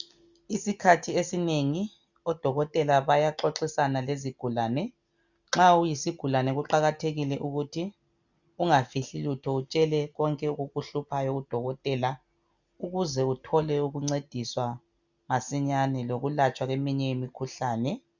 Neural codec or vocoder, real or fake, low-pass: none; real; 7.2 kHz